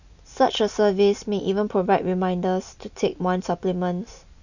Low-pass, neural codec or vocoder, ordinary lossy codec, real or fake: 7.2 kHz; none; none; real